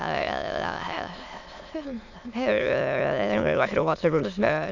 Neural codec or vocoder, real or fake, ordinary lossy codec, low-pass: autoencoder, 22.05 kHz, a latent of 192 numbers a frame, VITS, trained on many speakers; fake; none; 7.2 kHz